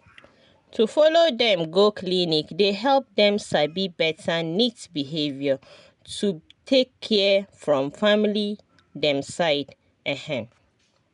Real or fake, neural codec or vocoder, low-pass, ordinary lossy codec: real; none; 10.8 kHz; none